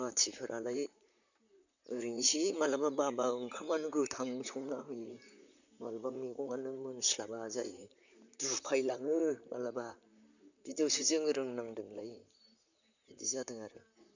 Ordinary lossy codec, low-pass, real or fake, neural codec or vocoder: none; 7.2 kHz; fake; vocoder, 44.1 kHz, 128 mel bands, Pupu-Vocoder